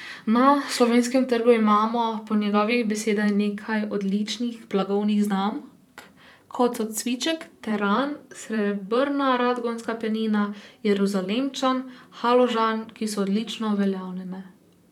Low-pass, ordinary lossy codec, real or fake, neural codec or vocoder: 19.8 kHz; none; fake; vocoder, 44.1 kHz, 128 mel bands, Pupu-Vocoder